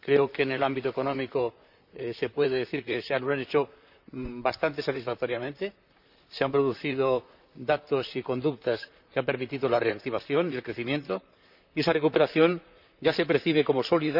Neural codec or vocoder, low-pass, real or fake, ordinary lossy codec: vocoder, 44.1 kHz, 128 mel bands, Pupu-Vocoder; 5.4 kHz; fake; none